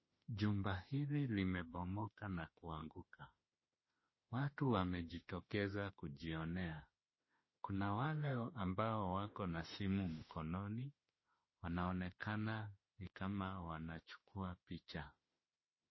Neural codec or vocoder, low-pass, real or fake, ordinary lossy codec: autoencoder, 48 kHz, 32 numbers a frame, DAC-VAE, trained on Japanese speech; 7.2 kHz; fake; MP3, 24 kbps